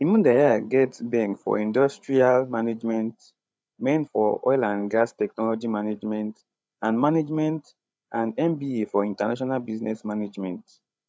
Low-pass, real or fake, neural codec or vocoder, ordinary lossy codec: none; fake; codec, 16 kHz, 8 kbps, FreqCodec, larger model; none